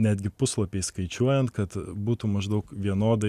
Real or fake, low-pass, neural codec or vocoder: real; 14.4 kHz; none